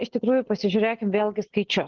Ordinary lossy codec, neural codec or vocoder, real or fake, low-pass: Opus, 16 kbps; none; real; 7.2 kHz